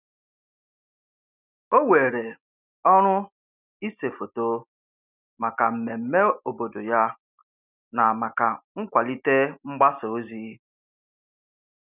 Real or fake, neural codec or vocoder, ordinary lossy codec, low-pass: real; none; none; 3.6 kHz